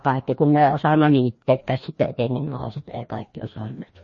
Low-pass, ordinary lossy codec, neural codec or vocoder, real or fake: 7.2 kHz; MP3, 32 kbps; codec, 16 kHz, 1 kbps, FreqCodec, larger model; fake